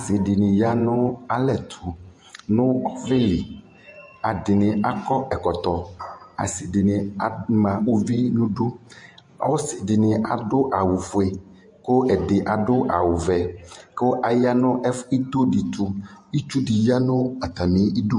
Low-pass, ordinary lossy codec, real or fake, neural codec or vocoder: 10.8 kHz; MP3, 48 kbps; fake; vocoder, 48 kHz, 128 mel bands, Vocos